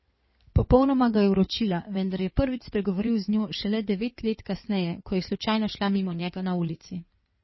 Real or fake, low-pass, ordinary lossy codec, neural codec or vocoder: fake; 7.2 kHz; MP3, 24 kbps; codec, 16 kHz in and 24 kHz out, 2.2 kbps, FireRedTTS-2 codec